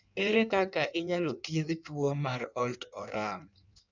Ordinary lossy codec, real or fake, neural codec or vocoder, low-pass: none; fake; codec, 16 kHz in and 24 kHz out, 1.1 kbps, FireRedTTS-2 codec; 7.2 kHz